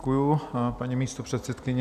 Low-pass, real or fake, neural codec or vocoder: 14.4 kHz; real; none